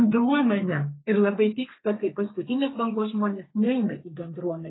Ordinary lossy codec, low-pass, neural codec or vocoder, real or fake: AAC, 16 kbps; 7.2 kHz; codec, 32 kHz, 1.9 kbps, SNAC; fake